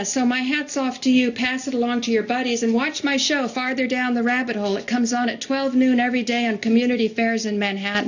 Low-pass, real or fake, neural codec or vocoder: 7.2 kHz; real; none